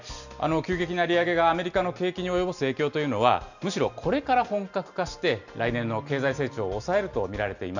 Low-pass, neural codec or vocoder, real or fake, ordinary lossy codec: 7.2 kHz; none; real; none